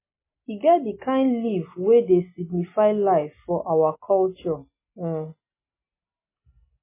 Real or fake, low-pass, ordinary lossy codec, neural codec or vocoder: real; 3.6 kHz; MP3, 16 kbps; none